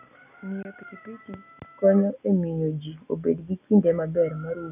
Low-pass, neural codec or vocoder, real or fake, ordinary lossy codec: 3.6 kHz; none; real; none